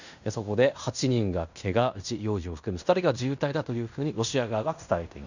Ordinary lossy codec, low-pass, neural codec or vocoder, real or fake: none; 7.2 kHz; codec, 16 kHz in and 24 kHz out, 0.9 kbps, LongCat-Audio-Codec, fine tuned four codebook decoder; fake